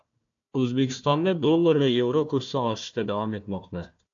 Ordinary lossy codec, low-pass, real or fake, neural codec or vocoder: AAC, 64 kbps; 7.2 kHz; fake; codec, 16 kHz, 1 kbps, FunCodec, trained on Chinese and English, 50 frames a second